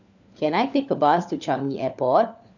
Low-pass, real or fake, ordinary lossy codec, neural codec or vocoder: 7.2 kHz; fake; none; codec, 16 kHz, 4 kbps, FunCodec, trained on LibriTTS, 50 frames a second